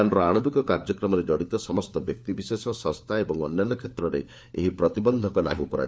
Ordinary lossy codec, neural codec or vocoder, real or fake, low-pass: none; codec, 16 kHz, 4 kbps, FunCodec, trained on LibriTTS, 50 frames a second; fake; none